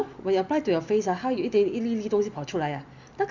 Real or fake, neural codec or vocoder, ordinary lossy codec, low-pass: real; none; Opus, 64 kbps; 7.2 kHz